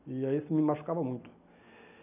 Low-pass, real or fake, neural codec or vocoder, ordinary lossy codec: 3.6 kHz; real; none; none